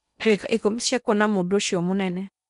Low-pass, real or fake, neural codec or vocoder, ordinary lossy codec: 10.8 kHz; fake; codec, 16 kHz in and 24 kHz out, 0.8 kbps, FocalCodec, streaming, 65536 codes; none